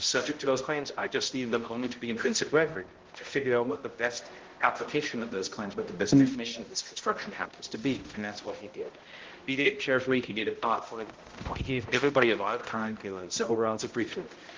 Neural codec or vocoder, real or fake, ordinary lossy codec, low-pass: codec, 16 kHz, 0.5 kbps, X-Codec, HuBERT features, trained on balanced general audio; fake; Opus, 16 kbps; 7.2 kHz